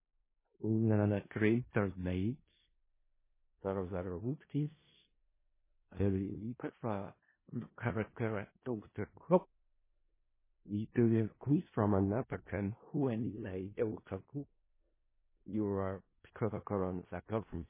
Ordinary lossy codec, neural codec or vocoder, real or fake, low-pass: MP3, 16 kbps; codec, 16 kHz in and 24 kHz out, 0.4 kbps, LongCat-Audio-Codec, four codebook decoder; fake; 3.6 kHz